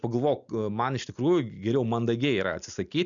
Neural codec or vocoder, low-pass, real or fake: none; 7.2 kHz; real